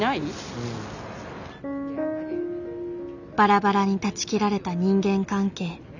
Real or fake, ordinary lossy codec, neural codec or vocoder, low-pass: real; none; none; 7.2 kHz